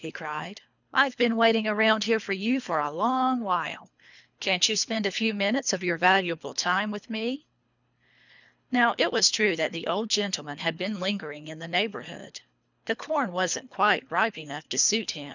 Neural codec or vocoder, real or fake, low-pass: codec, 24 kHz, 3 kbps, HILCodec; fake; 7.2 kHz